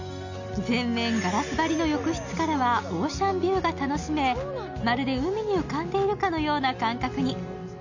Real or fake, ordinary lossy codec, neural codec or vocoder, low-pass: real; none; none; 7.2 kHz